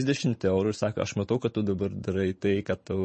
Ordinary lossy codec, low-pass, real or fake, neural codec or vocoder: MP3, 32 kbps; 10.8 kHz; fake; vocoder, 44.1 kHz, 128 mel bands every 512 samples, BigVGAN v2